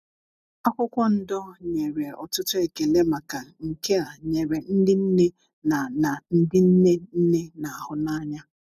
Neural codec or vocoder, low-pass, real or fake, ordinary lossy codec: none; 14.4 kHz; real; none